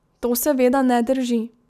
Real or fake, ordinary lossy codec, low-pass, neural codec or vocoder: real; none; 14.4 kHz; none